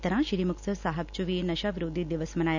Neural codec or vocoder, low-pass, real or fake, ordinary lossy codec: none; 7.2 kHz; real; none